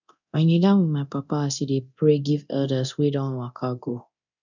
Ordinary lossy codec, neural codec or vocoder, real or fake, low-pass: none; codec, 24 kHz, 0.9 kbps, DualCodec; fake; 7.2 kHz